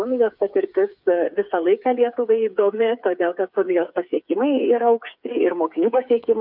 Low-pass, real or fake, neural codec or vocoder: 5.4 kHz; fake; codec, 16 kHz, 8 kbps, FreqCodec, smaller model